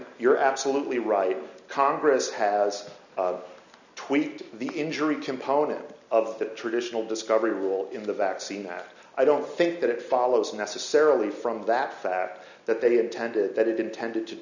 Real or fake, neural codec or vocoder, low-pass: real; none; 7.2 kHz